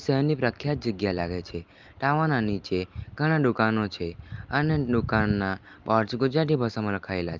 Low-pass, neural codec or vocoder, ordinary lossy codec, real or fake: 7.2 kHz; none; Opus, 32 kbps; real